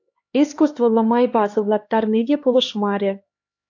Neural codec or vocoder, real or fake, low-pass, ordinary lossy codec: codec, 16 kHz, 2 kbps, X-Codec, HuBERT features, trained on LibriSpeech; fake; 7.2 kHz; AAC, 48 kbps